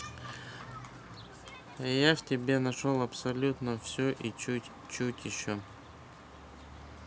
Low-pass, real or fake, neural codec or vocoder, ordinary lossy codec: none; real; none; none